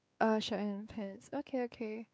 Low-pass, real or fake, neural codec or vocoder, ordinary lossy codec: none; fake; codec, 16 kHz, 4 kbps, X-Codec, WavLM features, trained on Multilingual LibriSpeech; none